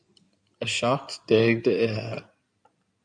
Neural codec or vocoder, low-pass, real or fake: codec, 16 kHz in and 24 kHz out, 2.2 kbps, FireRedTTS-2 codec; 9.9 kHz; fake